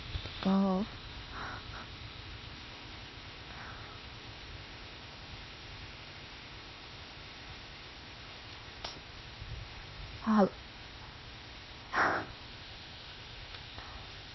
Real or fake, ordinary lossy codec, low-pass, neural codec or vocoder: fake; MP3, 24 kbps; 7.2 kHz; codec, 16 kHz, 0.8 kbps, ZipCodec